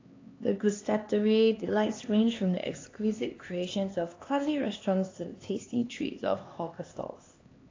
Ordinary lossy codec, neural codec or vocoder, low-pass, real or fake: AAC, 32 kbps; codec, 16 kHz, 2 kbps, X-Codec, HuBERT features, trained on LibriSpeech; 7.2 kHz; fake